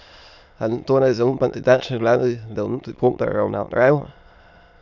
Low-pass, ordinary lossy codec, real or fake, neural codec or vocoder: 7.2 kHz; none; fake; autoencoder, 22.05 kHz, a latent of 192 numbers a frame, VITS, trained on many speakers